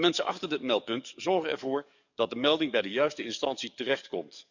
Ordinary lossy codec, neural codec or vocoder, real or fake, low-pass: none; codec, 44.1 kHz, 7.8 kbps, DAC; fake; 7.2 kHz